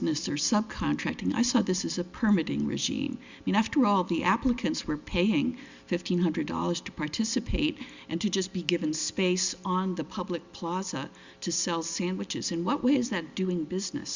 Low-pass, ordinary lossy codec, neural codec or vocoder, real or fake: 7.2 kHz; Opus, 64 kbps; none; real